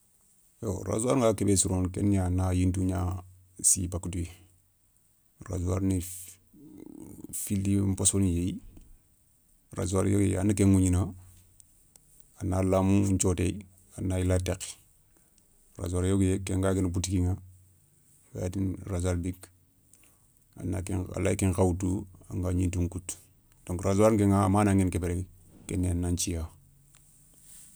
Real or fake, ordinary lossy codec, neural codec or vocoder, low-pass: real; none; none; none